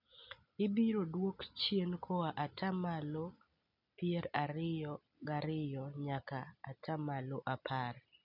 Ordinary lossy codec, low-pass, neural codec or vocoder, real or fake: none; 5.4 kHz; none; real